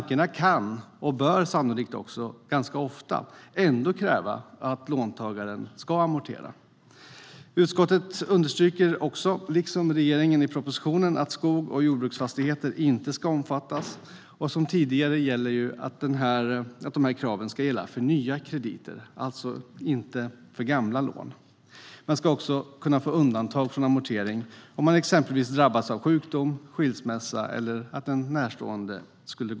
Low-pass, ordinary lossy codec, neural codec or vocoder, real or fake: none; none; none; real